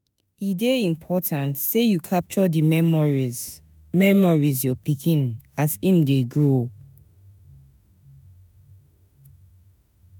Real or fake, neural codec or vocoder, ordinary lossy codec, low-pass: fake; autoencoder, 48 kHz, 32 numbers a frame, DAC-VAE, trained on Japanese speech; none; none